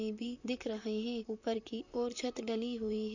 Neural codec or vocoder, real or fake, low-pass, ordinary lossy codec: vocoder, 44.1 kHz, 128 mel bands, Pupu-Vocoder; fake; 7.2 kHz; none